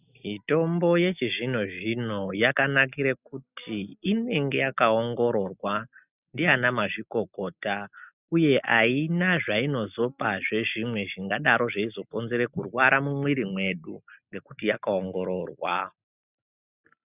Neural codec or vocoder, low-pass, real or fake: none; 3.6 kHz; real